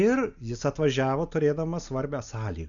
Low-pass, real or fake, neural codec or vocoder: 7.2 kHz; real; none